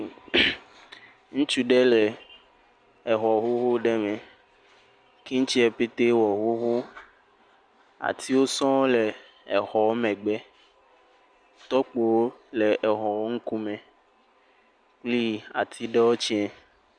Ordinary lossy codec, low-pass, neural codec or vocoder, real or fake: Opus, 64 kbps; 9.9 kHz; none; real